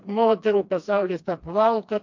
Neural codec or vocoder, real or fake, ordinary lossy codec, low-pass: codec, 16 kHz, 2 kbps, FreqCodec, smaller model; fake; MP3, 48 kbps; 7.2 kHz